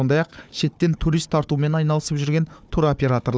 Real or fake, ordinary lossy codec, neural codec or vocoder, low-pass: fake; none; codec, 16 kHz, 4 kbps, FunCodec, trained on Chinese and English, 50 frames a second; none